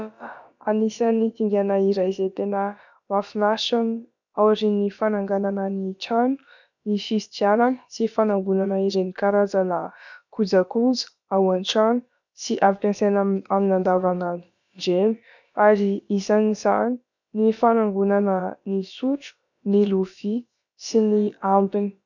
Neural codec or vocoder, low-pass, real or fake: codec, 16 kHz, about 1 kbps, DyCAST, with the encoder's durations; 7.2 kHz; fake